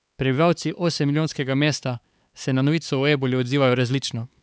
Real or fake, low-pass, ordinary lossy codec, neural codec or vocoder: fake; none; none; codec, 16 kHz, 4 kbps, X-Codec, HuBERT features, trained on LibriSpeech